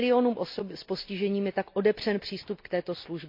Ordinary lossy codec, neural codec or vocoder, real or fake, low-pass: MP3, 32 kbps; none; real; 5.4 kHz